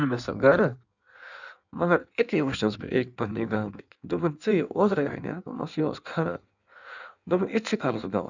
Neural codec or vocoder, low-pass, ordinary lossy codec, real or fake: codec, 16 kHz in and 24 kHz out, 1.1 kbps, FireRedTTS-2 codec; 7.2 kHz; none; fake